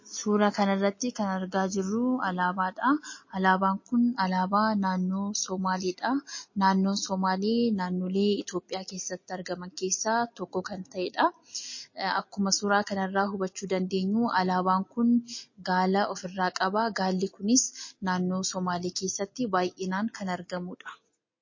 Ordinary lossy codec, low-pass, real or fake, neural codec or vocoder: MP3, 32 kbps; 7.2 kHz; real; none